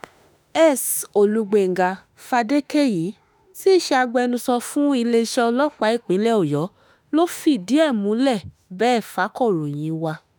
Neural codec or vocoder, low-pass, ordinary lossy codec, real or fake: autoencoder, 48 kHz, 32 numbers a frame, DAC-VAE, trained on Japanese speech; none; none; fake